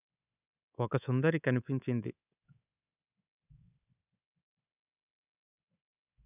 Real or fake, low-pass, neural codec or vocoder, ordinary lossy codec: fake; 3.6 kHz; codec, 24 kHz, 3.1 kbps, DualCodec; none